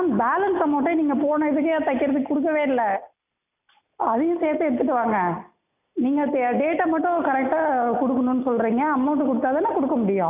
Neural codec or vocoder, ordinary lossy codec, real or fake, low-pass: none; none; real; 3.6 kHz